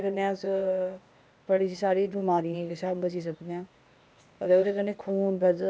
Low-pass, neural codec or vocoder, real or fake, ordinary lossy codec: none; codec, 16 kHz, 0.8 kbps, ZipCodec; fake; none